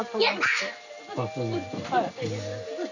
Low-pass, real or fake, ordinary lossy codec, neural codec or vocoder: 7.2 kHz; real; none; none